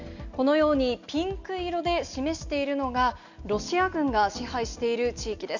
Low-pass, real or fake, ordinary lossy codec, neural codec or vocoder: 7.2 kHz; real; none; none